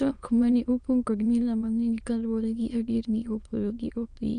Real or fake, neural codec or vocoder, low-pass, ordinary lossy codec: fake; autoencoder, 22.05 kHz, a latent of 192 numbers a frame, VITS, trained on many speakers; 9.9 kHz; none